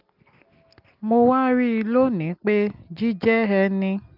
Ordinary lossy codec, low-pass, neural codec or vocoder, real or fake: Opus, 32 kbps; 5.4 kHz; none; real